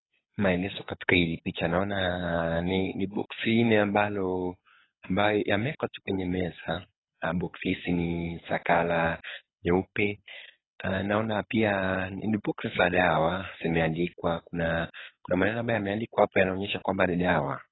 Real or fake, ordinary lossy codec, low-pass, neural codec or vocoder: fake; AAC, 16 kbps; 7.2 kHz; codec, 24 kHz, 6 kbps, HILCodec